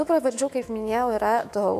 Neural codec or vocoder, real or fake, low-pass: autoencoder, 48 kHz, 128 numbers a frame, DAC-VAE, trained on Japanese speech; fake; 14.4 kHz